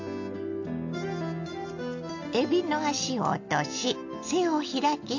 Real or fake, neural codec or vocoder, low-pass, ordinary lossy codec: real; none; 7.2 kHz; AAC, 48 kbps